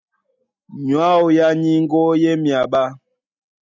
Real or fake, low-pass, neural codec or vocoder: real; 7.2 kHz; none